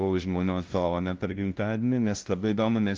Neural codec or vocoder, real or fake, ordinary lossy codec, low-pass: codec, 16 kHz, 0.5 kbps, FunCodec, trained on LibriTTS, 25 frames a second; fake; Opus, 16 kbps; 7.2 kHz